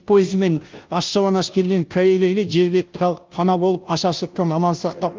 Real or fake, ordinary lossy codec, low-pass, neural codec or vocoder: fake; Opus, 32 kbps; 7.2 kHz; codec, 16 kHz, 0.5 kbps, FunCodec, trained on Chinese and English, 25 frames a second